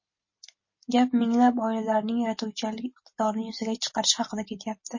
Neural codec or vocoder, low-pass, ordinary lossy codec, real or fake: none; 7.2 kHz; MP3, 32 kbps; real